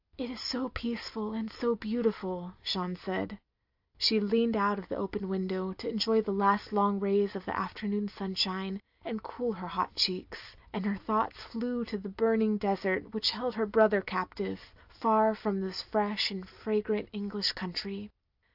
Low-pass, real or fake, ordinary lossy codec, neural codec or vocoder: 5.4 kHz; real; AAC, 48 kbps; none